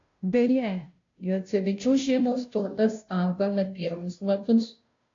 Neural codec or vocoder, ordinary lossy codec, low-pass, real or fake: codec, 16 kHz, 0.5 kbps, FunCodec, trained on Chinese and English, 25 frames a second; AAC, 48 kbps; 7.2 kHz; fake